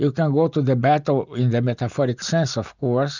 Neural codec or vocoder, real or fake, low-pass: none; real; 7.2 kHz